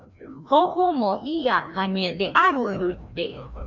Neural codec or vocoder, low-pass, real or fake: codec, 16 kHz, 1 kbps, FreqCodec, larger model; 7.2 kHz; fake